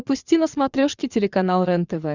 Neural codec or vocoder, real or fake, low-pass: vocoder, 22.05 kHz, 80 mel bands, Vocos; fake; 7.2 kHz